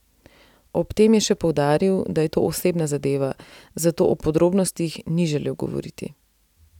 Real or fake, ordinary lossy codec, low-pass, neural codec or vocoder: real; none; 19.8 kHz; none